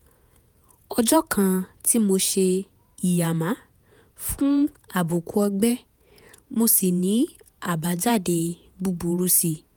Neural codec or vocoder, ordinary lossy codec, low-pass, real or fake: vocoder, 48 kHz, 128 mel bands, Vocos; none; none; fake